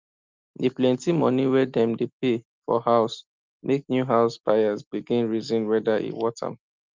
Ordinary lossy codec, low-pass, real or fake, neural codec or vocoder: Opus, 24 kbps; 7.2 kHz; real; none